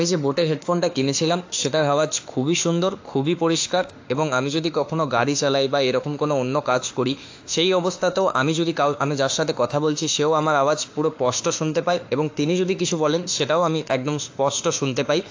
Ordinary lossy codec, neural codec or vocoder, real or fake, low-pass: AAC, 48 kbps; autoencoder, 48 kHz, 32 numbers a frame, DAC-VAE, trained on Japanese speech; fake; 7.2 kHz